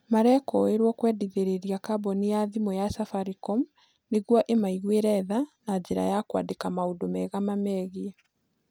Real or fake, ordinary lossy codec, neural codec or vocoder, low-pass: real; none; none; none